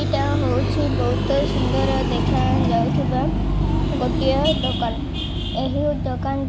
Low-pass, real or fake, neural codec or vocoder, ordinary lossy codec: none; real; none; none